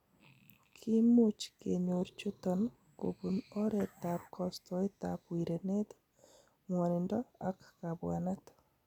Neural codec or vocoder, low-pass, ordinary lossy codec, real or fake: none; 19.8 kHz; none; real